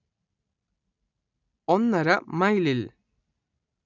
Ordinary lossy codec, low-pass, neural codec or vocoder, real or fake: none; 7.2 kHz; none; real